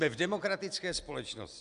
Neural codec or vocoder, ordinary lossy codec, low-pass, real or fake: none; AAC, 96 kbps; 10.8 kHz; real